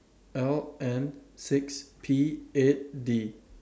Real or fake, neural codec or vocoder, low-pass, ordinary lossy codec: real; none; none; none